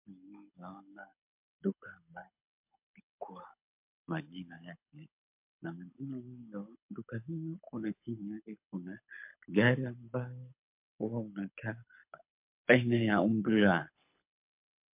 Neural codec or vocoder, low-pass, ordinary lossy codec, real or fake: codec, 24 kHz, 6 kbps, HILCodec; 3.6 kHz; MP3, 32 kbps; fake